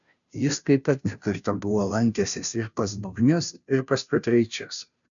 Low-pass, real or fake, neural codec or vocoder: 7.2 kHz; fake; codec, 16 kHz, 0.5 kbps, FunCodec, trained on Chinese and English, 25 frames a second